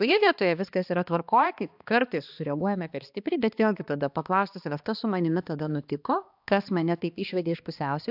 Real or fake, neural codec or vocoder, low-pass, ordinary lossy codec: fake; codec, 16 kHz, 2 kbps, X-Codec, HuBERT features, trained on balanced general audio; 5.4 kHz; AAC, 48 kbps